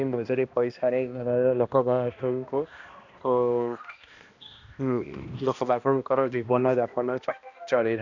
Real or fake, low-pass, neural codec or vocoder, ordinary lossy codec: fake; 7.2 kHz; codec, 16 kHz, 1 kbps, X-Codec, HuBERT features, trained on balanced general audio; none